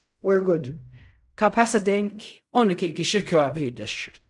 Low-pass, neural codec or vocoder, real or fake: 10.8 kHz; codec, 16 kHz in and 24 kHz out, 0.4 kbps, LongCat-Audio-Codec, fine tuned four codebook decoder; fake